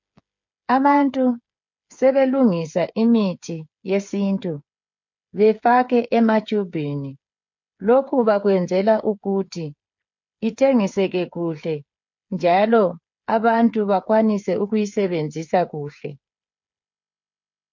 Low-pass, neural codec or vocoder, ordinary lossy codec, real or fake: 7.2 kHz; codec, 16 kHz, 8 kbps, FreqCodec, smaller model; MP3, 48 kbps; fake